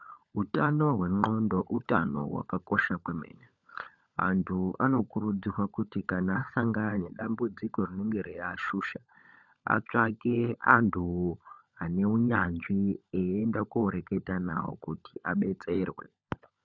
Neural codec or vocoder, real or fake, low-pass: codec, 16 kHz, 16 kbps, FunCodec, trained on LibriTTS, 50 frames a second; fake; 7.2 kHz